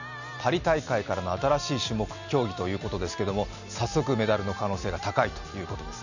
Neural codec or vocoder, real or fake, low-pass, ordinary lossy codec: none; real; 7.2 kHz; none